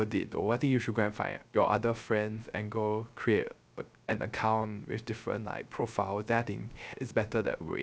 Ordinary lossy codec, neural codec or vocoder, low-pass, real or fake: none; codec, 16 kHz, 0.3 kbps, FocalCodec; none; fake